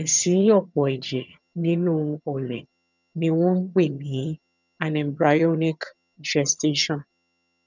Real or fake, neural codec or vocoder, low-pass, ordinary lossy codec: fake; vocoder, 22.05 kHz, 80 mel bands, HiFi-GAN; 7.2 kHz; none